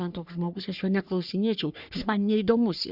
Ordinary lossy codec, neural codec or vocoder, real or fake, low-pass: Opus, 64 kbps; codec, 44.1 kHz, 3.4 kbps, Pupu-Codec; fake; 5.4 kHz